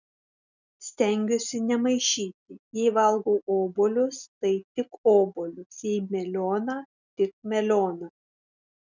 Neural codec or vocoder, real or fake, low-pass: none; real; 7.2 kHz